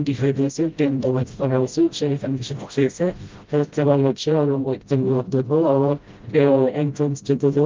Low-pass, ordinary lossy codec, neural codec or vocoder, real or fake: 7.2 kHz; Opus, 24 kbps; codec, 16 kHz, 0.5 kbps, FreqCodec, smaller model; fake